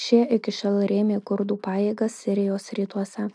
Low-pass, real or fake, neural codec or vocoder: 9.9 kHz; real; none